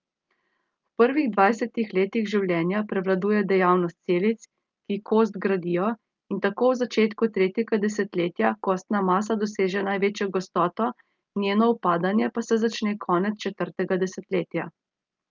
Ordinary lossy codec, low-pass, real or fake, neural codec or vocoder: Opus, 24 kbps; 7.2 kHz; real; none